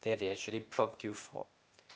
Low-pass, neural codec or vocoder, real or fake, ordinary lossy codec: none; codec, 16 kHz, 0.8 kbps, ZipCodec; fake; none